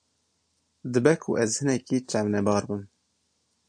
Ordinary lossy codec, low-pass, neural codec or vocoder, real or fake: AAC, 64 kbps; 9.9 kHz; none; real